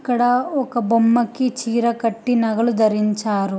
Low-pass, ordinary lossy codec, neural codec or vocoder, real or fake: none; none; none; real